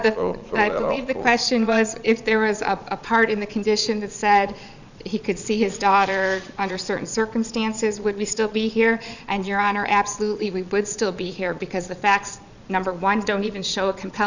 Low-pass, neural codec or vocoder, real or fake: 7.2 kHz; vocoder, 22.05 kHz, 80 mel bands, Vocos; fake